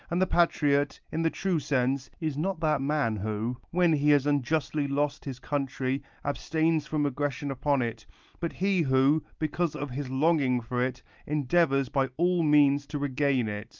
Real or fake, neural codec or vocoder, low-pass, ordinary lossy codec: real; none; 7.2 kHz; Opus, 24 kbps